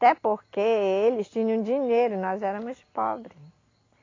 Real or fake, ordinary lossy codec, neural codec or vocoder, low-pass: real; AAC, 32 kbps; none; 7.2 kHz